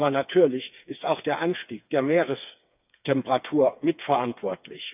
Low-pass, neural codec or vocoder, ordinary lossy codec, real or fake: 3.6 kHz; codec, 16 kHz, 4 kbps, FreqCodec, smaller model; none; fake